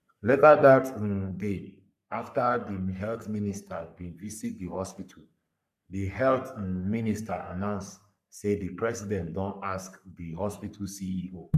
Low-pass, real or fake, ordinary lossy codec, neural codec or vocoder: 14.4 kHz; fake; none; codec, 44.1 kHz, 3.4 kbps, Pupu-Codec